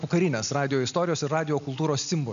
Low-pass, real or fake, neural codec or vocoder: 7.2 kHz; real; none